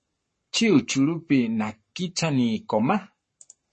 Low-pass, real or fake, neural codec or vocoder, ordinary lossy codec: 10.8 kHz; fake; codec, 44.1 kHz, 7.8 kbps, Pupu-Codec; MP3, 32 kbps